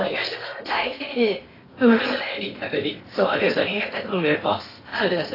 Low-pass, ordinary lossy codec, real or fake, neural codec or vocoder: 5.4 kHz; AAC, 24 kbps; fake; codec, 16 kHz in and 24 kHz out, 0.8 kbps, FocalCodec, streaming, 65536 codes